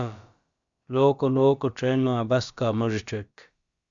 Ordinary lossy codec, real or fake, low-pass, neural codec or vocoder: Opus, 64 kbps; fake; 7.2 kHz; codec, 16 kHz, about 1 kbps, DyCAST, with the encoder's durations